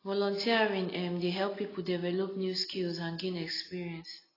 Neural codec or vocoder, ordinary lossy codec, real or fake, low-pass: none; AAC, 24 kbps; real; 5.4 kHz